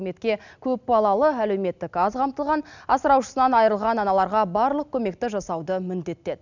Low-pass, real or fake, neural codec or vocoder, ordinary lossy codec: 7.2 kHz; real; none; none